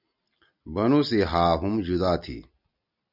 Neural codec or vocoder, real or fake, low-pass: none; real; 5.4 kHz